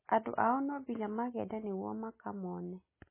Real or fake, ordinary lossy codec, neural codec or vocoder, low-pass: real; MP3, 16 kbps; none; 3.6 kHz